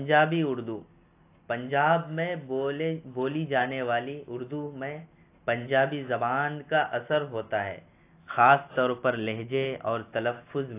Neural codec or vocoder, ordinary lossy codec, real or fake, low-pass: none; AAC, 24 kbps; real; 3.6 kHz